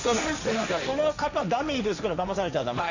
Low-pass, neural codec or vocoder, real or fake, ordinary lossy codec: 7.2 kHz; codec, 16 kHz, 1.1 kbps, Voila-Tokenizer; fake; none